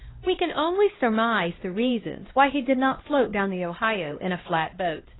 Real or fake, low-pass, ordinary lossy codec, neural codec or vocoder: fake; 7.2 kHz; AAC, 16 kbps; codec, 16 kHz, 1 kbps, X-Codec, HuBERT features, trained on LibriSpeech